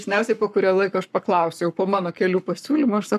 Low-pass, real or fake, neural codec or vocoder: 14.4 kHz; fake; codec, 44.1 kHz, 7.8 kbps, Pupu-Codec